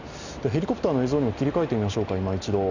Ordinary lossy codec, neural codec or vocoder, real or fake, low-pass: none; none; real; 7.2 kHz